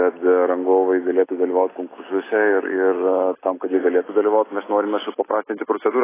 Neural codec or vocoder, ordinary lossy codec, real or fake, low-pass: none; AAC, 16 kbps; real; 3.6 kHz